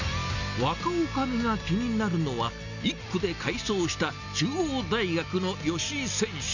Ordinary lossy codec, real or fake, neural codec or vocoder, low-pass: none; real; none; 7.2 kHz